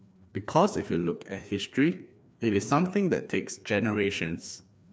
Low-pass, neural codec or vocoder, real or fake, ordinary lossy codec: none; codec, 16 kHz, 2 kbps, FreqCodec, larger model; fake; none